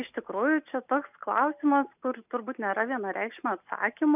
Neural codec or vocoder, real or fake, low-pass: none; real; 3.6 kHz